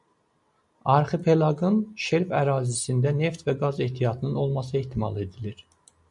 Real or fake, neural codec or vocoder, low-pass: real; none; 10.8 kHz